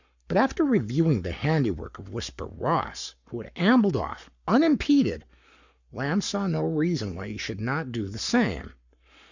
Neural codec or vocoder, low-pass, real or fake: codec, 44.1 kHz, 7.8 kbps, Pupu-Codec; 7.2 kHz; fake